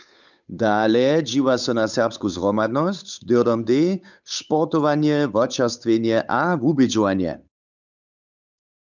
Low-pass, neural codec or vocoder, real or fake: 7.2 kHz; codec, 16 kHz, 8 kbps, FunCodec, trained on Chinese and English, 25 frames a second; fake